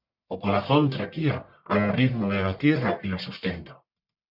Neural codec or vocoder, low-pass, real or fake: codec, 44.1 kHz, 1.7 kbps, Pupu-Codec; 5.4 kHz; fake